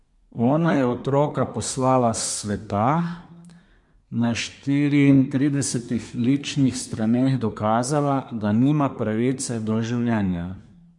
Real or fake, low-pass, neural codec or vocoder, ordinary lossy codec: fake; 10.8 kHz; codec, 24 kHz, 1 kbps, SNAC; MP3, 64 kbps